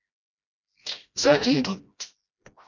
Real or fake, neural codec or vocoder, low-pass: fake; codec, 16 kHz, 1 kbps, FreqCodec, smaller model; 7.2 kHz